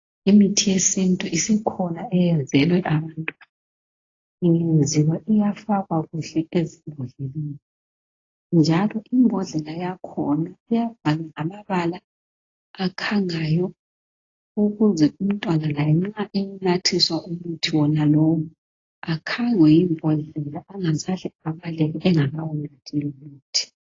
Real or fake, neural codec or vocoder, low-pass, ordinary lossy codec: real; none; 7.2 kHz; AAC, 32 kbps